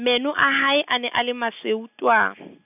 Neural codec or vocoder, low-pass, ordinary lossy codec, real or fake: none; 3.6 kHz; none; real